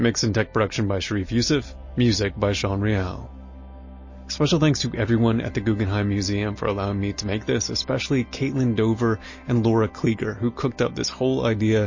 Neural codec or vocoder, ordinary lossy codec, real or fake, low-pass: none; MP3, 32 kbps; real; 7.2 kHz